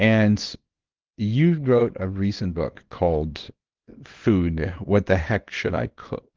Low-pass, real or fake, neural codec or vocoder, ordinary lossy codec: 7.2 kHz; fake; codec, 24 kHz, 0.9 kbps, WavTokenizer, small release; Opus, 16 kbps